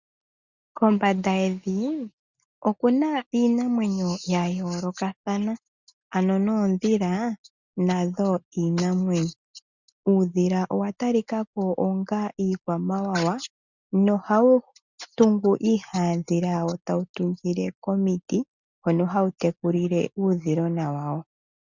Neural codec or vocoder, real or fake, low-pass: none; real; 7.2 kHz